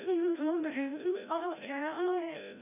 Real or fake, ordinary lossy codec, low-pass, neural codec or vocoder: fake; none; 3.6 kHz; codec, 16 kHz, 0.5 kbps, FreqCodec, larger model